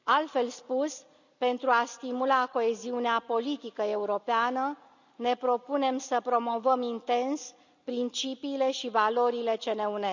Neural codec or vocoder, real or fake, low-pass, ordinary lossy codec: none; real; 7.2 kHz; none